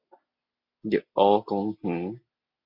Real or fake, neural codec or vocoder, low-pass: real; none; 5.4 kHz